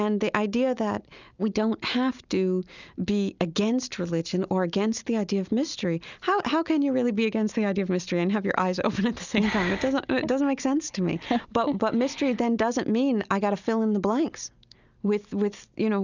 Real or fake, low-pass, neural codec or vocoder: real; 7.2 kHz; none